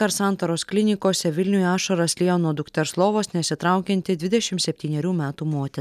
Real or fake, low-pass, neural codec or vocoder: real; 14.4 kHz; none